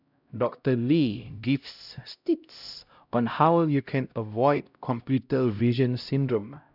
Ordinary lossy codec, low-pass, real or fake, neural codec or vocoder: none; 5.4 kHz; fake; codec, 16 kHz, 0.5 kbps, X-Codec, HuBERT features, trained on LibriSpeech